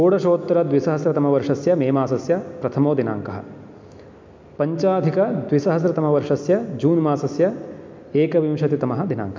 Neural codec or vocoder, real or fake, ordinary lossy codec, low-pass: none; real; MP3, 64 kbps; 7.2 kHz